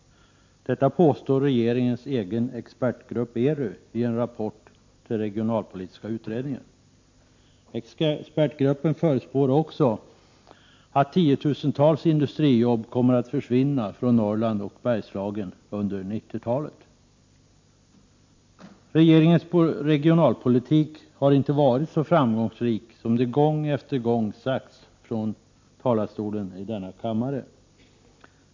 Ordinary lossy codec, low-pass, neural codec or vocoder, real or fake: MP3, 48 kbps; 7.2 kHz; none; real